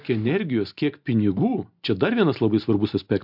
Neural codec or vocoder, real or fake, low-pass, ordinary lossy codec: none; real; 5.4 kHz; MP3, 48 kbps